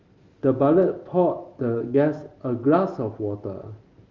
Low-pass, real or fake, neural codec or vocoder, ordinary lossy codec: 7.2 kHz; real; none; Opus, 32 kbps